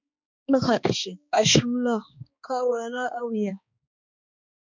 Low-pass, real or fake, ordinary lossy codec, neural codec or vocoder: 7.2 kHz; fake; MP3, 64 kbps; codec, 16 kHz, 2 kbps, X-Codec, HuBERT features, trained on balanced general audio